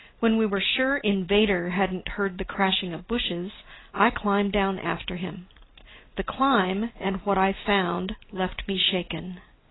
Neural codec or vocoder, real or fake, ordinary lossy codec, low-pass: none; real; AAC, 16 kbps; 7.2 kHz